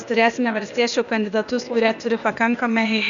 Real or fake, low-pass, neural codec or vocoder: fake; 7.2 kHz; codec, 16 kHz, 0.8 kbps, ZipCodec